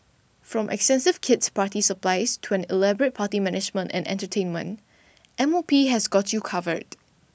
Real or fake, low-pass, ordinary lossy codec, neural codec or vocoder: real; none; none; none